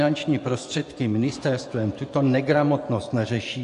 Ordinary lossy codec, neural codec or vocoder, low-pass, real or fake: AAC, 64 kbps; vocoder, 24 kHz, 100 mel bands, Vocos; 10.8 kHz; fake